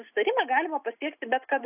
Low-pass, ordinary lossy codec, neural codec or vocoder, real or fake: 3.6 kHz; AAC, 32 kbps; none; real